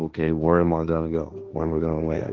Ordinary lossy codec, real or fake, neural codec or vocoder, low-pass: Opus, 32 kbps; fake; codec, 16 kHz, 1.1 kbps, Voila-Tokenizer; 7.2 kHz